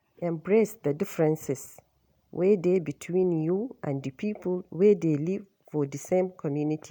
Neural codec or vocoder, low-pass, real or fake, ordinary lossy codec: none; none; real; none